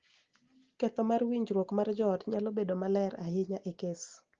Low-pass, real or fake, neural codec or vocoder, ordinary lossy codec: 7.2 kHz; real; none; Opus, 16 kbps